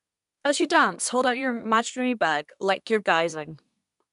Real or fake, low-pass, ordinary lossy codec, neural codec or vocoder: fake; 10.8 kHz; none; codec, 24 kHz, 1 kbps, SNAC